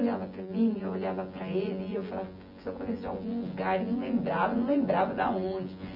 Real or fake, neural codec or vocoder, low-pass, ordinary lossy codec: fake; vocoder, 24 kHz, 100 mel bands, Vocos; 5.4 kHz; none